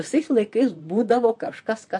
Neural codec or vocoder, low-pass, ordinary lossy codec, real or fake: vocoder, 44.1 kHz, 128 mel bands, Pupu-Vocoder; 10.8 kHz; MP3, 48 kbps; fake